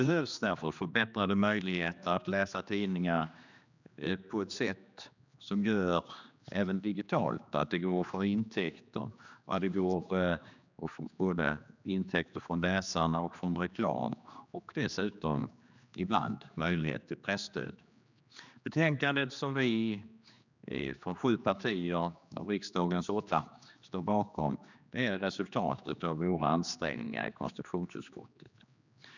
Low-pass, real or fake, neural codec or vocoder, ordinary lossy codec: 7.2 kHz; fake; codec, 16 kHz, 2 kbps, X-Codec, HuBERT features, trained on general audio; none